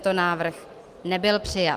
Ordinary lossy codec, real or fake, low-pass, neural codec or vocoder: Opus, 24 kbps; real; 14.4 kHz; none